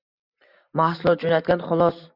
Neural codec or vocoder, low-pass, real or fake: none; 5.4 kHz; real